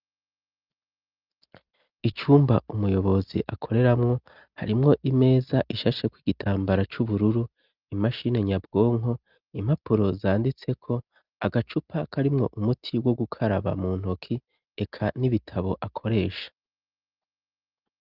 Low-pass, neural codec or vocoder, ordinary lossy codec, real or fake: 5.4 kHz; none; Opus, 32 kbps; real